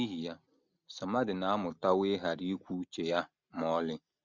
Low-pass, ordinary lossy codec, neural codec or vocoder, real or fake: 7.2 kHz; Opus, 64 kbps; none; real